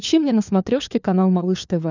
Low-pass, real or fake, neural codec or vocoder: 7.2 kHz; fake; codec, 16 kHz, 4 kbps, FunCodec, trained on LibriTTS, 50 frames a second